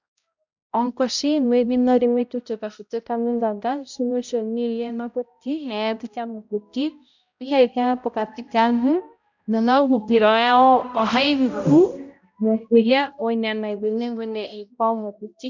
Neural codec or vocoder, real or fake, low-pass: codec, 16 kHz, 0.5 kbps, X-Codec, HuBERT features, trained on balanced general audio; fake; 7.2 kHz